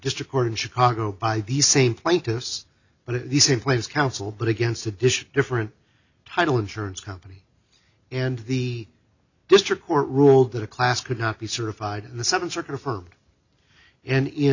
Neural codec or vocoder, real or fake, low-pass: none; real; 7.2 kHz